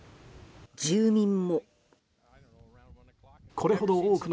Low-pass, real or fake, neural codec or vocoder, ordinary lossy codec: none; real; none; none